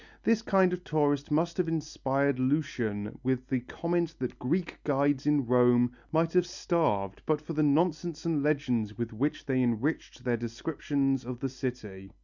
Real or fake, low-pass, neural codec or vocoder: real; 7.2 kHz; none